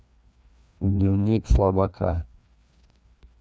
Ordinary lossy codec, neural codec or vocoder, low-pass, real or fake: none; codec, 16 kHz, 2 kbps, FreqCodec, larger model; none; fake